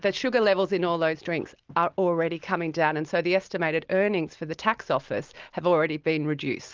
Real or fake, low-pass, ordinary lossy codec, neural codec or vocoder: real; 7.2 kHz; Opus, 32 kbps; none